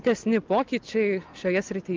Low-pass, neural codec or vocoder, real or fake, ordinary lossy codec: 7.2 kHz; none; real; Opus, 32 kbps